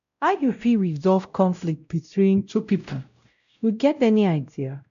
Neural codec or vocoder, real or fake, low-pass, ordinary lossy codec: codec, 16 kHz, 0.5 kbps, X-Codec, WavLM features, trained on Multilingual LibriSpeech; fake; 7.2 kHz; none